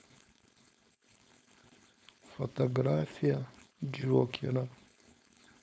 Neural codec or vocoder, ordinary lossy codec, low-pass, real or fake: codec, 16 kHz, 4.8 kbps, FACodec; none; none; fake